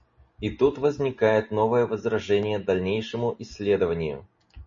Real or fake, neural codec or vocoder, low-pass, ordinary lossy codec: real; none; 7.2 kHz; MP3, 32 kbps